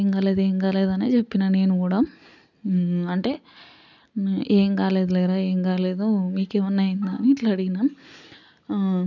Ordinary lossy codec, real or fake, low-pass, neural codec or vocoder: none; real; 7.2 kHz; none